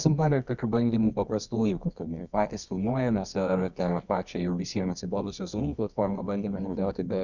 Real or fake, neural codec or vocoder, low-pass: fake; codec, 24 kHz, 0.9 kbps, WavTokenizer, medium music audio release; 7.2 kHz